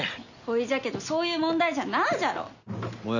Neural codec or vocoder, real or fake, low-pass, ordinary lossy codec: none; real; 7.2 kHz; none